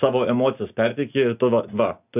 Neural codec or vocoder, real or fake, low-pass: none; real; 3.6 kHz